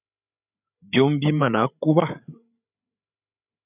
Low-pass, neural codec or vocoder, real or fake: 3.6 kHz; codec, 16 kHz, 8 kbps, FreqCodec, larger model; fake